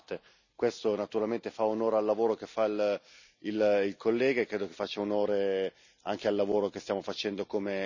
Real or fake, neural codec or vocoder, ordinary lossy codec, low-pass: real; none; none; 7.2 kHz